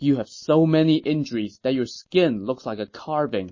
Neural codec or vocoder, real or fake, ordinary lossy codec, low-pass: none; real; MP3, 32 kbps; 7.2 kHz